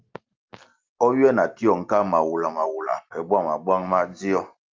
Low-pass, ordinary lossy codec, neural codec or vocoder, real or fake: 7.2 kHz; Opus, 24 kbps; none; real